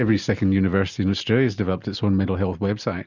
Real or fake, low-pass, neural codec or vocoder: real; 7.2 kHz; none